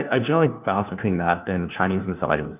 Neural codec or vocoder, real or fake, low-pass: codec, 16 kHz, 1 kbps, FunCodec, trained on LibriTTS, 50 frames a second; fake; 3.6 kHz